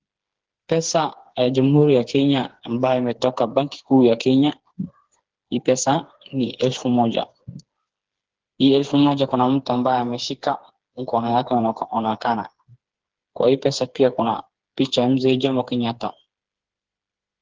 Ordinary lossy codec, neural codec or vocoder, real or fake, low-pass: Opus, 16 kbps; codec, 16 kHz, 4 kbps, FreqCodec, smaller model; fake; 7.2 kHz